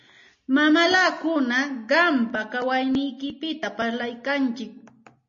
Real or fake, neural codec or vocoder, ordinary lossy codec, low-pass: real; none; MP3, 32 kbps; 7.2 kHz